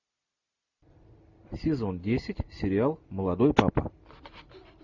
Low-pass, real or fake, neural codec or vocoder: 7.2 kHz; real; none